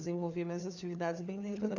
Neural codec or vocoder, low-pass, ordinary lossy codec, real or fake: codec, 16 kHz, 4 kbps, FunCodec, trained on LibriTTS, 50 frames a second; 7.2 kHz; none; fake